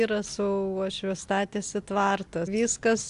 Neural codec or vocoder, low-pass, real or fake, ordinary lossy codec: none; 10.8 kHz; real; AAC, 64 kbps